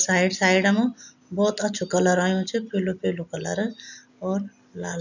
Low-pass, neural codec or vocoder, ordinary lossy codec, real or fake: 7.2 kHz; none; none; real